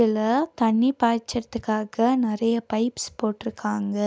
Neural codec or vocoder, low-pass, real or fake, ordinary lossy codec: none; none; real; none